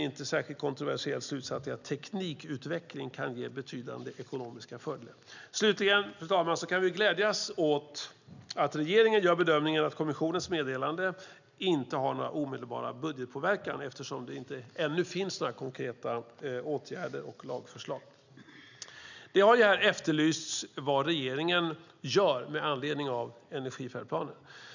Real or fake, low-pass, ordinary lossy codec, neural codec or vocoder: real; 7.2 kHz; none; none